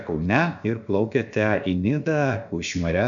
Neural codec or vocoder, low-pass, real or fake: codec, 16 kHz, about 1 kbps, DyCAST, with the encoder's durations; 7.2 kHz; fake